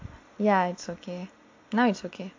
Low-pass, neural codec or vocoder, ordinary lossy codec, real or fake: 7.2 kHz; none; MP3, 48 kbps; real